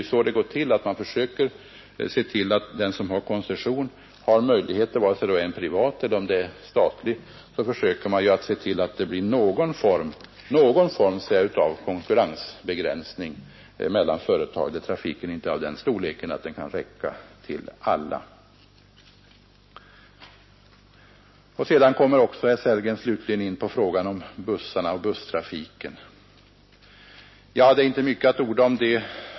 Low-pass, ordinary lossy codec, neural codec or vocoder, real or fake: 7.2 kHz; MP3, 24 kbps; none; real